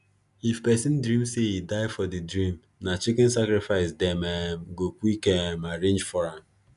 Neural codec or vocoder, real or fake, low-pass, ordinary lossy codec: none; real; 10.8 kHz; none